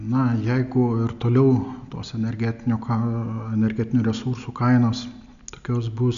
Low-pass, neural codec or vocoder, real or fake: 7.2 kHz; none; real